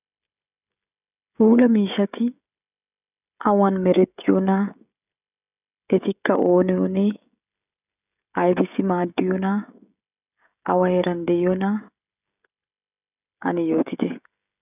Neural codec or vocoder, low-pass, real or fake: codec, 16 kHz, 16 kbps, FreqCodec, smaller model; 3.6 kHz; fake